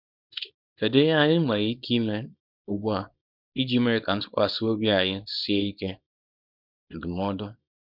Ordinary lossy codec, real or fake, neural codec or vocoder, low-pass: none; fake; codec, 24 kHz, 0.9 kbps, WavTokenizer, small release; 5.4 kHz